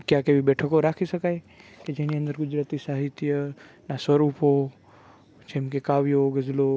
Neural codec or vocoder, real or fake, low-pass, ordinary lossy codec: none; real; none; none